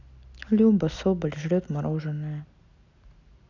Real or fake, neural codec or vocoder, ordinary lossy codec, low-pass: real; none; none; 7.2 kHz